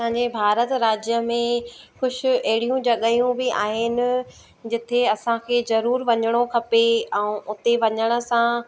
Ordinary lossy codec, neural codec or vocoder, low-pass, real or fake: none; none; none; real